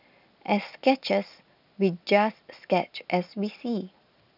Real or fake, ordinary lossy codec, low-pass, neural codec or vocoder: real; none; 5.4 kHz; none